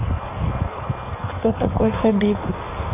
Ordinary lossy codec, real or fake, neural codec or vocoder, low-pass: none; fake; codec, 16 kHz in and 24 kHz out, 1.1 kbps, FireRedTTS-2 codec; 3.6 kHz